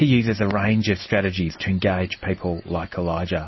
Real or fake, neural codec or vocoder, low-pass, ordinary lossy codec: real; none; 7.2 kHz; MP3, 24 kbps